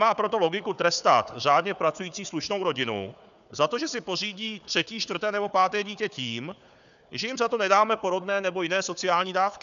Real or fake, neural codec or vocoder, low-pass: fake; codec, 16 kHz, 4 kbps, FunCodec, trained on Chinese and English, 50 frames a second; 7.2 kHz